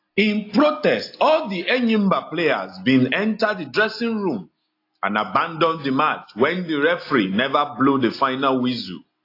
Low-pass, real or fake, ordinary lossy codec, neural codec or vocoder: 5.4 kHz; real; AAC, 32 kbps; none